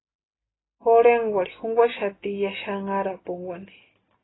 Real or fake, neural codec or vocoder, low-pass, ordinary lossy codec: real; none; 7.2 kHz; AAC, 16 kbps